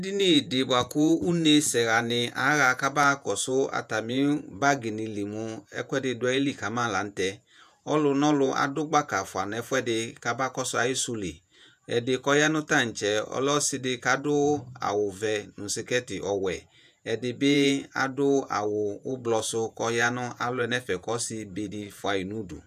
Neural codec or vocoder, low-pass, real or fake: vocoder, 48 kHz, 128 mel bands, Vocos; 14.4 kHz; fake